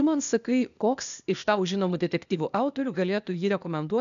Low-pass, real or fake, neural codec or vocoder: 7.2 kHz; fake; codec, 16 kHz, 0.8 kbps, ZipCodec